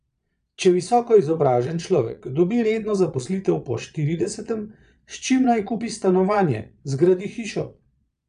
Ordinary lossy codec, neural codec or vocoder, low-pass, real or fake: none; vocoder, 22.05 kHz, 80 mel bands, Vocos; 9.9 kHz; fake